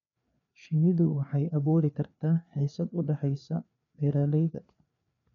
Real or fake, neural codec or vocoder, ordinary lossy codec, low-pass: fake; codec, 16 kHz, 4 kbps, FreqCodec, larger model; none; 7.2 kHz